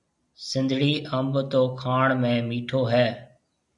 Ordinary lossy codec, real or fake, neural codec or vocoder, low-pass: MP3, 96 kbps; real; none; 10.8 kHz